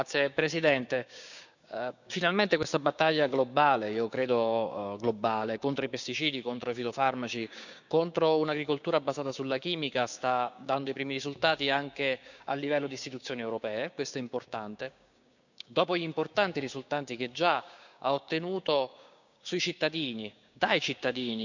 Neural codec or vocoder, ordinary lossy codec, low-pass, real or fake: codec, 16 kHz, 6 kbps, DAC; none; 7.2 kHz; fake